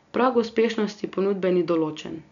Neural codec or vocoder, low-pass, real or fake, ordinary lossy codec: none; 7.2 kHz; real; none